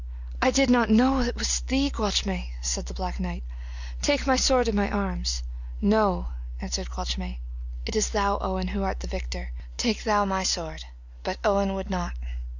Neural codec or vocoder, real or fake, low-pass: none; real; 7.2 kHz